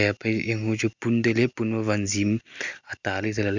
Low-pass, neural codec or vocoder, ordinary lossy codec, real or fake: 7.2 kHz; none; Opus, 64 kbps; real